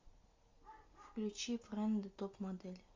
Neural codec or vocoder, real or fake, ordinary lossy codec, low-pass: none; real; MP3, 64 kbps; 7.2 kHz